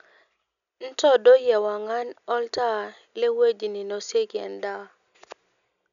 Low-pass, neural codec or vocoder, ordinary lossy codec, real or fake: 7.2 kHz; none; none; real